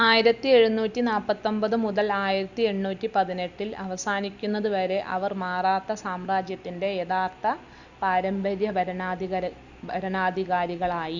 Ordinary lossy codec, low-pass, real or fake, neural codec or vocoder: Opus, 64 kbps; 7.2 kHz; real; none